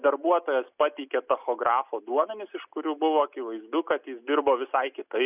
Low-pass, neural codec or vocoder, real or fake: 3.6 kHz; none; real